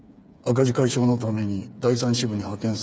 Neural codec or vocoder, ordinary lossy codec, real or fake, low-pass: codec, 16 kHz, 8 kbps, FreqCodec, smaller model; none; fake; none